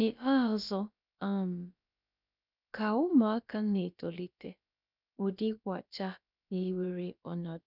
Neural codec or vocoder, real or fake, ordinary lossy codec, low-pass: codec, 16 kHz, about 1 kbps, DyCAST, with the encoder's durations; fake; none; 5.4 kHz